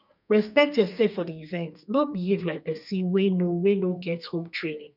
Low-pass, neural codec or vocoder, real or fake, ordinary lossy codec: 5.4 kHz; codec, 32 kHz, 1.9 kbps, SNAC; fake; none